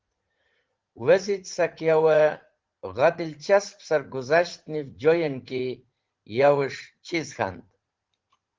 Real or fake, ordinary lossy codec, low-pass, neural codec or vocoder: fake; Opus, 16 kbps; 7.2 kHz; vocoder, 44.1 kHz, 80 mel bands, Vocos